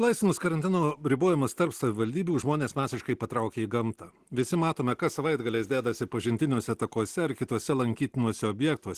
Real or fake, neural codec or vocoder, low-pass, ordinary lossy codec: real; none; 14.4 kHz; Opus, 16 kbps